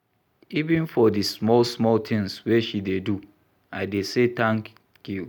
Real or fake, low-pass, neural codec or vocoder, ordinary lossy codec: real; 19.8 kHz; none; none